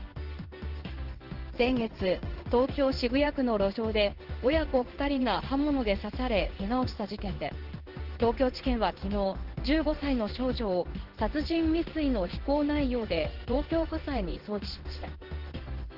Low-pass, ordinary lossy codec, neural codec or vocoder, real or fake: 5.4 kHz; Opus, 16 kbps; codec, 16 kHz in and 24 kHz out, 1 kbps, XY-Tokenizer; fake